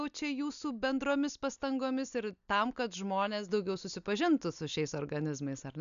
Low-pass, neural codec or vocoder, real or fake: 7.2 kHz; none; real